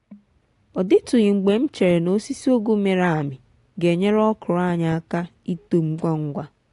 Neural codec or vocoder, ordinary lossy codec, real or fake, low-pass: none; AAC, 48 kbps; real; 10.8 kHz